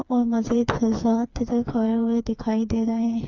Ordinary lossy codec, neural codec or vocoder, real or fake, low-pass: Opus, 64 kbps; codec, 16 kHz, 4 kbps, FreqCodec, smaller model; fake; 7.2 kHz